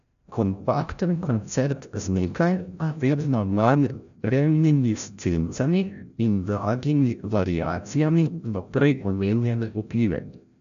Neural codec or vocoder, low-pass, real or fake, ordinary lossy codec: codec, 16 kHz, 0.5 kbps, FreqCodec, larger model; 7.2 kHz; fake; none